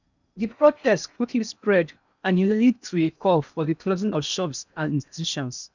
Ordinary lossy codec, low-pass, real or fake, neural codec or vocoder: none; 7.2 kHz; fake; codec, 16 kHz in and 24 kHz out, 0.8 kbps, FocalCodec, streaming, 65536 codes